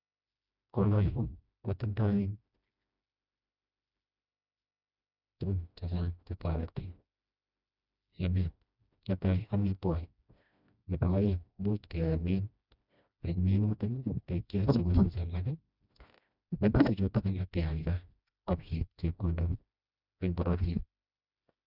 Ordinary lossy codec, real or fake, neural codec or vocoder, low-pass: none; fake; codec, 16 kHz, 1 kbps, FreqCodec, smaller model; 5.4 kHz